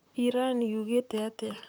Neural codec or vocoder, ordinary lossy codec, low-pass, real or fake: vocoder, 44.1 kHz, 128 mel bands, Pupu-Vocoder; none; none; fake